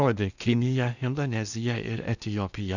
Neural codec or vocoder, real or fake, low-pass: codec, 16 kHz in and 24 kHz out, 0.8 kbps, FocalCodec, streaming, 65536 codes; fake; 7.2 kHz